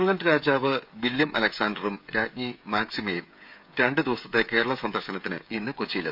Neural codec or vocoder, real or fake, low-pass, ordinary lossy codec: codec, 16 kHz, 16 kbps, FreqCodec, smaller model; fake; 5.4 kHz; none